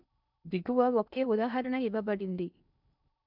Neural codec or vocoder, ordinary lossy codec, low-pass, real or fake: codec, 16 kHz in and 24 kHz out, 0.8 kbps, FocalCodec, streaming, 65536 codes; none; 5.4 kHz; fake